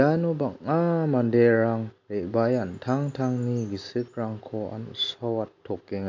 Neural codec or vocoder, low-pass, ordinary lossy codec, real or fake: none; 7.2 kHz; MP3, 48 kbps; real